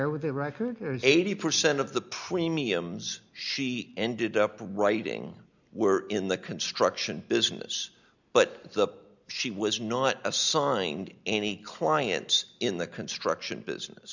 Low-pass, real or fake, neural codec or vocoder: 7.2 kHz; real; none